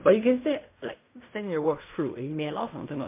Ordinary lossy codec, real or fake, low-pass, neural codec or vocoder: MP3, 24 kbps; fake; 3.6 kHz; codec, 16 kHz in and 24 kHz out, 0.4 kbps, LongCat-Audio-Codec, fine tuned four codebook decoder